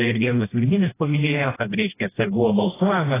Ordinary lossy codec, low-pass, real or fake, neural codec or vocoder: AAC, 16 kbps; 3.6 kHz; fake; codec, 16 kHz, 1 kbps, FreqCodec, smaller model